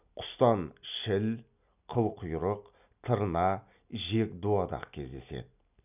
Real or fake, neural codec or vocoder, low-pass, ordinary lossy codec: real; none; 3.6 kHz; none